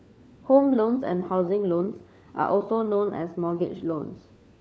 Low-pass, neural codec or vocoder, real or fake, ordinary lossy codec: none; codec, 16 kHz, 4 kbps, FunCodec, trained on LibriTTS, 50 frames a second; fake; none